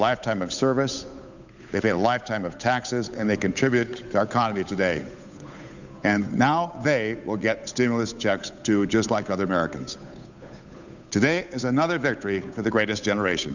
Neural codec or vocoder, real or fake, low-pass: codec, 16 kHz, 8 kbps, FunCodec, trained on Chinese and English, 25 frames a second; fake; 7.2 kHz